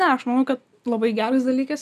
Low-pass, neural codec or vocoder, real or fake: 14.4 kHz; none; real